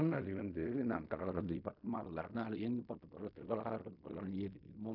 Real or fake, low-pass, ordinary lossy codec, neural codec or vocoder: fake; 5.4 kHz; none; codec, 16 kHz in and 24 kHz out, 0.4 kbps, LongCat-Audio-Codec, fine tuned four codebook decoder